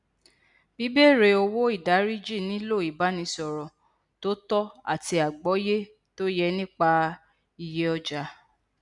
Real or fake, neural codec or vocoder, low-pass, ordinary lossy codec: real; none; 10.8 kHz; none